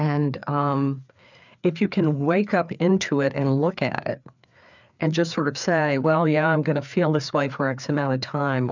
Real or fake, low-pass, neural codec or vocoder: fake; 7.2 kHz; codec, 16 kHz, 4 kbps, FreqCodec, larger model